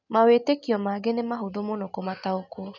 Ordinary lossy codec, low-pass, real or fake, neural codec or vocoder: none; 7.2 kHz; real; none